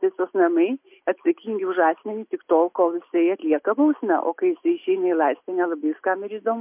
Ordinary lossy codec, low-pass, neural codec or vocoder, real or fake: MP3, 32 kbps; 3.6 kHz; none; real